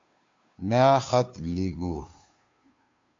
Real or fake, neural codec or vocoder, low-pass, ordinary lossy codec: fake; codec, 16 kHz, 2 kbps, FunCodec, trained on Chinese and English, 25 frames a second; 7.2 kHz; AAC, 48 kbps